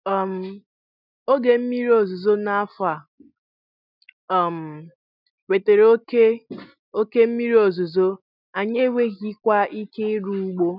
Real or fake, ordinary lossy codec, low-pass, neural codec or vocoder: real; none; 5.4 kHz; none